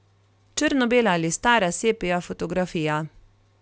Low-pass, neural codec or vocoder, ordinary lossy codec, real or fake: none; none; none; real